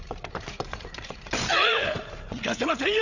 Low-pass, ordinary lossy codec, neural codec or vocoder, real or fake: 7.2 kHz; none; codec, 16 kHz, 8 kbps, FreqCodec, larger model; fake